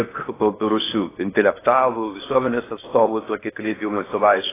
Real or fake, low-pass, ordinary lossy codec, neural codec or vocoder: fake; 3.6 kHz; AAC, 16 kbps; codec, 16 kHz in and 24 kHz out, 0.8 kbps, FocalCodec, streaming, 65536 codes